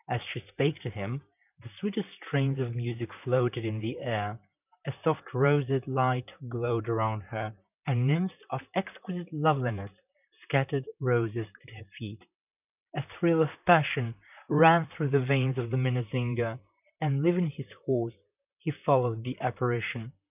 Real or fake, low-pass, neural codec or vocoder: fake; 3.6 kHz; vocoder, 44.1 kHz, 128 mel bands, Pupu-Vocoder